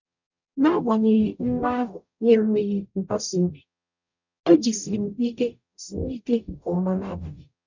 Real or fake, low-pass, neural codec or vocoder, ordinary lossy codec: fake; 7.2 kHz; codec, 44.1 kHz, 0.9 kbps, DAC; none